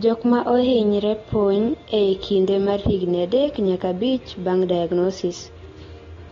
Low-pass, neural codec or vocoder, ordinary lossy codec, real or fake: 7.2 kHz; none; AAC, 32 kbps; real